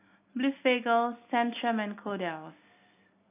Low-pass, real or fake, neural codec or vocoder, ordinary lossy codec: 3.6 kHz; real; none; AAC, 24 kbps